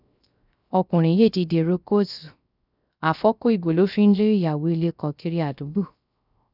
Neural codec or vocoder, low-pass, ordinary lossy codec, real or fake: codec, 16 kHz, 0.3 kbps, FocalCodec; 5.4 kHz; none; fake